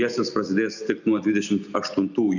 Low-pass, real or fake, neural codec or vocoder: 7.2 kHz; real; none